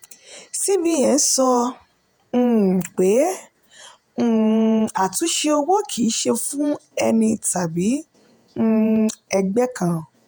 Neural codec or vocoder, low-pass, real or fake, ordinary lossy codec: vocoder, 48 kHz, 128 mel bands, Vocos; none; fake; none